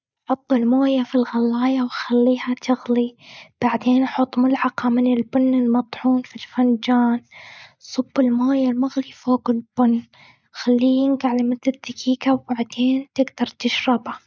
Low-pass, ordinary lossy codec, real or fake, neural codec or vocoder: none; none; real; none